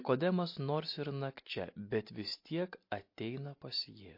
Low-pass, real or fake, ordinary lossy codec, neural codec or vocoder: 5.4 kHz; real; MP3, 32 kbps; none